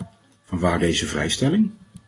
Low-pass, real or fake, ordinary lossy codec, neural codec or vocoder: 10.8 kHz; real; AAC, 32 kbps; none